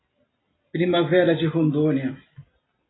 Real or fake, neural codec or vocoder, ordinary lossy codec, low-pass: real; none; AAC, 16 kbps; 7.2 kHz